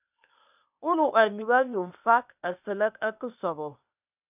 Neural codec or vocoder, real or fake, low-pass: codec, 16 kHz, 0.8 kbps, ZipCodec; fake; 3.6 kHz